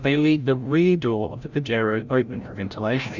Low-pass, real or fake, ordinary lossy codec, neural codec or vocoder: 7.2 kHz; fake; Opus, 64 kbps; codec, 16 kHz, 0.5 kbps, FreqCodec, larger model